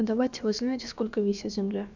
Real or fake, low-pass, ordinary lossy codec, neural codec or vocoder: fake; 7.2 kHz; none; codec, 16 kHz, about 1 kbps, DyCAST, with the encoder's durations